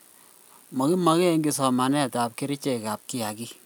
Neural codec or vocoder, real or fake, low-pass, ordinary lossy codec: none; real; none; none